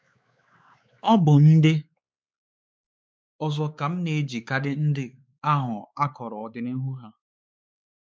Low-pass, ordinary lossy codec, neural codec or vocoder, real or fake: none; none; codec, 16 kHz, 4 kbps, X-Codec, WavLM features, trained on Multilingual LibriSpeech; fake